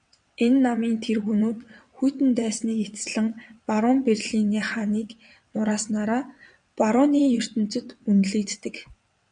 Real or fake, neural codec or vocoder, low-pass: fake; vocoder, 22.05 kHz, 80 mel bands, WaveNeXt; 9.9 kHz